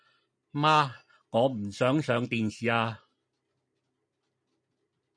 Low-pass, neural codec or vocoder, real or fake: 9.9 kHz; none; real